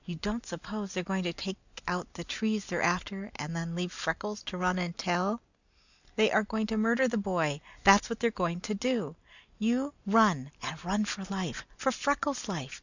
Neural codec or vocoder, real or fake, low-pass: none; real; 7.2 kHz